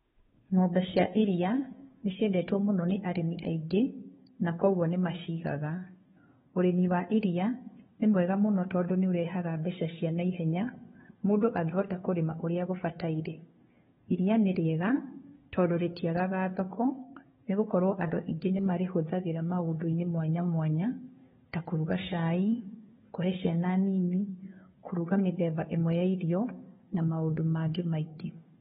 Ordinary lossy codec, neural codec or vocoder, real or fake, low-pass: AAC, 16 kbps; codec, 16 kHz, 2 kbps, FunCodec, trained on Chinese and English, 25 frames a second; fake; 7.2 kHz